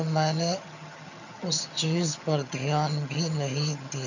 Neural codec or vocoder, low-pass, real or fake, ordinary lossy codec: vocoder, 22.05 kHz, 80 mel bands, HiFi-GAN; 7.2 kHz; fake; none